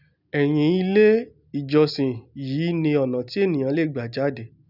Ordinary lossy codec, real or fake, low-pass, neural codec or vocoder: none; real; 5.4 kHz; none